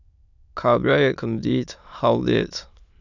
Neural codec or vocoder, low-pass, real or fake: autoencoder, 22.05 kHz, a latent of 192 numbers a frame, VITS, trained on many speakers; 7.2 kHz; fake